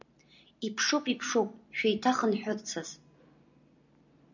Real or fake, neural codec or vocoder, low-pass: real; none; 7.2 kHz